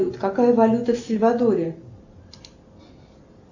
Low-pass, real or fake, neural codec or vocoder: 7.2 kHz; real; none